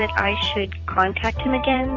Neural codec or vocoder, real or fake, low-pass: vocoder, 44.1 kHz, 128 mel bands every 256 samples, BigVGAN v2; fake; 7.2 kHz